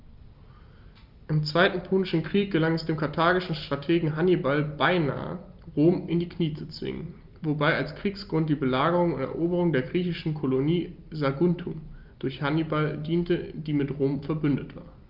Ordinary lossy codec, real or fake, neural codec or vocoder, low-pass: Opus, 32 kbps; real; none; 5.4 kHz